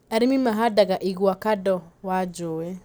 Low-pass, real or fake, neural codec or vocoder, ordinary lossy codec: none; real; none; none